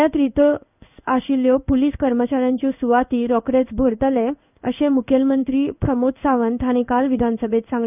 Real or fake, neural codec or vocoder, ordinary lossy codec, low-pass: fake; codec, 16 kHz in and 24 kHz out, 1 kbps, XY-Tokenizer; none; 3.6 kHz